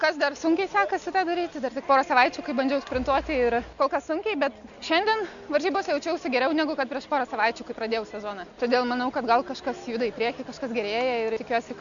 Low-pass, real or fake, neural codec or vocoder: 7.2 kHz; real; none